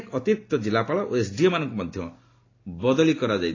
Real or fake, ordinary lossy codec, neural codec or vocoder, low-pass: real; AAC, 32 kbps; none; 7.2 kHz